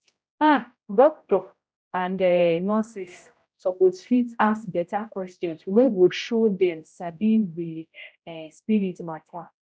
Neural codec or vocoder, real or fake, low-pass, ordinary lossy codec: codec, 16 kHz, 0.5 kbps, X-Codec, HuBERT features, trained on general audio; fake; none; none